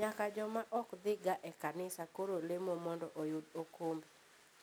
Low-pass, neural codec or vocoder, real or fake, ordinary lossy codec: none; none; real; none